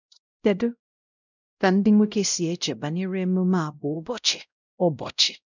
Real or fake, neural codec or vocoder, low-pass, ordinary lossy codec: fake; codec, 16 kHz, 0.5 kbps, X-Codec, WavLM features, trained on Multilingual LibriSpeech; 7.2 kHz; none